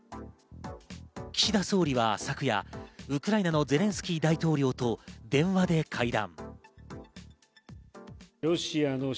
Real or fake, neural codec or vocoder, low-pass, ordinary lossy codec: real; none; none; none